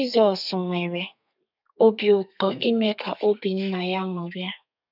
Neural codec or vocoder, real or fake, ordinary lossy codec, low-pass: codec, 32 kHz, 1.9 kbps, SNAC; fake; none; 5.4 kHz